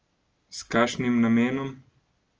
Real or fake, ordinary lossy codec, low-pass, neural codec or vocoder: real; Opus, 24 kbps; 7.2 kHz; none